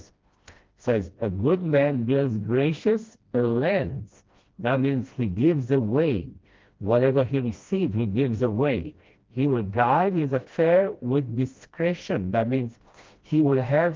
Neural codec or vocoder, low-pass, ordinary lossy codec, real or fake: codec, 16 kHz, 1 kbps, FreqCodec, smaller model; 7.2 kHz; Opus, 32 kbps; fake